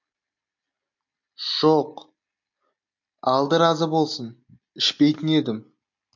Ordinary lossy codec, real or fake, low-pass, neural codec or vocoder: MP3, 48 kbps; real; 7.2 kHz; none